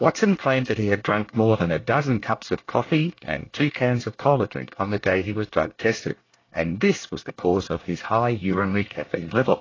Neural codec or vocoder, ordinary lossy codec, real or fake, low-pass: codec, 24 kHz, 1 kbps, SNAC; AAC, 32 kbps; fake; 7.2 kHz